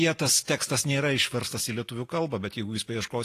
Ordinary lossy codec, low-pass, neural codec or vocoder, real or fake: AAC, 48 kbps; 14.4 kHz; none; real